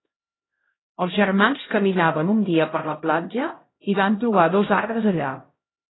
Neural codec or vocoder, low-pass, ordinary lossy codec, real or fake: codec, 16 kHz, 0.5 kbps, X-Codec, HuBERT features, trained on LibriSpeech; 7.2 kHz; AAC, 16 kbps; fake